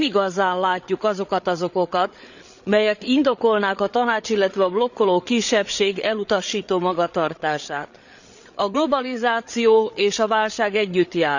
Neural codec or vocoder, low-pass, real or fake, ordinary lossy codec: codec, 16 kHz, 8 kbps, FreqCodec, larger model; 7.2 kHz; fake; none